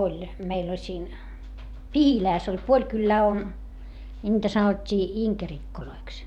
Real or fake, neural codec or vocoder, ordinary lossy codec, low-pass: fake; vocoder, 44.1 kHz, 128 mel bands every 512 samples, BigVGAN v2; none; 19.8 kHz